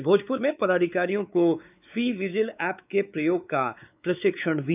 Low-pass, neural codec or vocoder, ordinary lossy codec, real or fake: 3.6 kHz; codec, 16 kHz, 4 kbps, X-Codec, WavLM features, trained on Multilingual LibriSpeech; none; fake